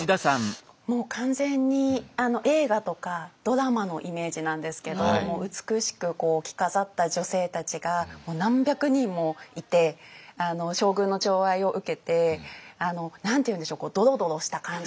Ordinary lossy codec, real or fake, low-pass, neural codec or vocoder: none; real; none; none